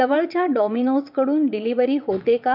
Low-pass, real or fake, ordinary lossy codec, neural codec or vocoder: 5.4 kHz; fake; none; vocoder, 22.05 kHz, 80 mel bands, WaveNeXt